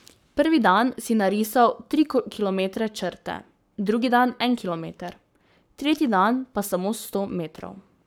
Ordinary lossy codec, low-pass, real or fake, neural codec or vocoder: none; none; fake; codec, 44.1 kHz, 7.8 kbps, Pupu-Codec